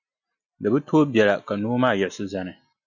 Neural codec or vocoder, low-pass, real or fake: none; 7.2 kHz; real